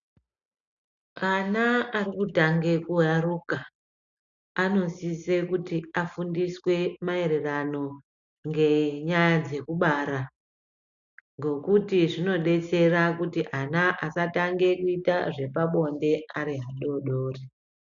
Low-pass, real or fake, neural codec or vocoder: 7.2 kHz; real; none